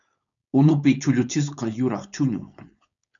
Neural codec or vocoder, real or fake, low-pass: codec, 16 kHz, 4.8 kbps, FACodec; fake; 7.2 kHz